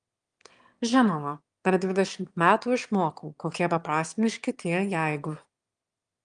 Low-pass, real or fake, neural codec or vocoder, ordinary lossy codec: 9.9 kHz; fake; autoencoder, 22.05 kHz, a latent of 192 numbers a frame, VITS, trained on one speaker; Opus, 32 kbps